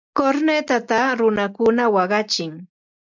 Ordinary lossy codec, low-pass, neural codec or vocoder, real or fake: MP3, 64 kbps; 7.2 kHz; none; real